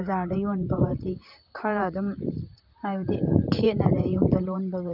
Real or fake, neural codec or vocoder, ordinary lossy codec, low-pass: fake; vocoder, 44.1 kHz, 128 mel bands, Pupu-Vocoder; none; 5.4 kHz